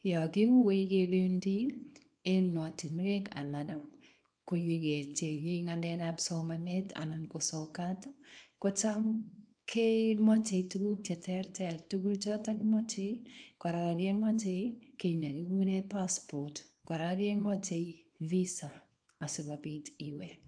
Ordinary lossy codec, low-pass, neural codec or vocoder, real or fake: AAC, 64 kbps; 9.9 kHz; codec, 24 kHz, 0.9 kbps, WavTokenizer, small release; fake